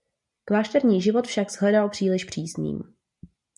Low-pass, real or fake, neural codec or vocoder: 10.8 kHz; real; none